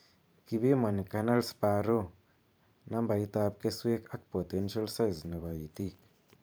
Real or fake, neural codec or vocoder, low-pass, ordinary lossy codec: fake; vocoder, 44.1 kHz, 128 mel bands every 512 samples, BigVGAN v2; none; none